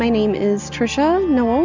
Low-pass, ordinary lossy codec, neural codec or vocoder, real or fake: 7.2 kHz; MP3, 64 kbps; none; real